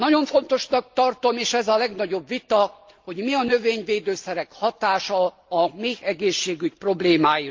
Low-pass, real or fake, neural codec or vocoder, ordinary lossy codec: 7.2 kHz; real; none; Opus, 24 kbps